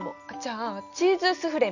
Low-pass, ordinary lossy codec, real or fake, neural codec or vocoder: 7.2 kHz; none; real; none